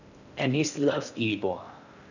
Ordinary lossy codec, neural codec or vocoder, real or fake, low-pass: none; codec, 16 kHz in and 24 kHz out, 0.6 kbps, FocalCodec, streaming, 4096 codes; fake; 7.2 kHz